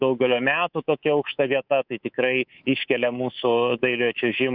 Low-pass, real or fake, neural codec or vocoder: 5.4 kHz; fake; codec, 24 kHz, 3.1 kbps, DualCodec